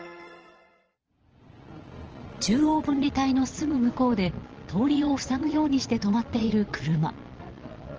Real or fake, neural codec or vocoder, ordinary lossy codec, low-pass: fake; vocoder, 22.05 kHz, 80 mel bands, Vocos; Opus, 16 kbps; 7.2 kHz